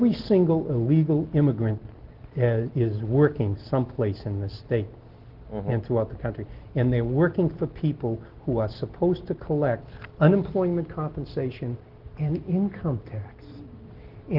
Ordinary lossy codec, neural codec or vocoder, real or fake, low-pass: Opus, 16 kbps; none; real; 5.4 kHz